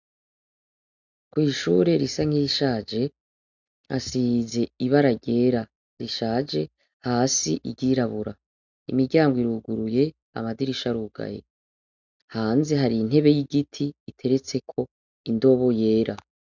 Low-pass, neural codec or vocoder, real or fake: 7.2 kHz; none; real